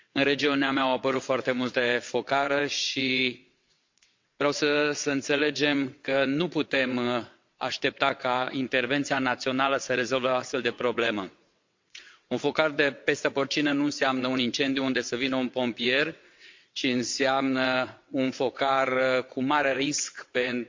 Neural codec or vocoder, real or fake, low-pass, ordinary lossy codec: vocoder, 22.05 kHz, 80 mel bands, WaveNeXt; fake; 7.2 kHz; MP3, 48 kbps